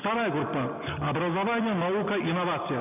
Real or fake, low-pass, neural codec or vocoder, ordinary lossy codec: real; 3.6 kHz; none; none